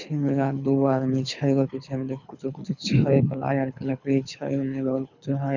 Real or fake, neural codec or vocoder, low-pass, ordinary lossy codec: fake; codec, 24 kHz, 6 kbps, HILCodec; 7.2 kHz; none